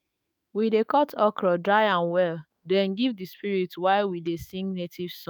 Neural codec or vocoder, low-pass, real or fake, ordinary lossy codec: autoencoder, 48 kHz, 128 numbers a frame, DAC-VAE, trained on Japanese speech; none; fake; none